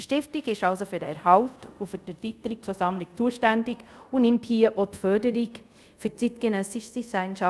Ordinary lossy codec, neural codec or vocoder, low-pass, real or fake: none; codec, 24 kHz, 0.5 kbps, DualCodec; none; fake